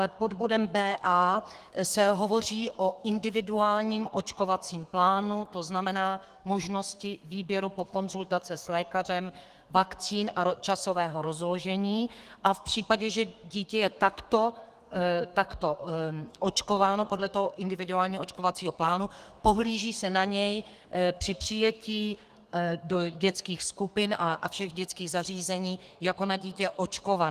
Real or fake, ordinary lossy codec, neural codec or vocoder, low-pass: fake; Opus, 24 kbps; codec, 32 kHz, 1.9 kbps, SNAC; 14.4 kHz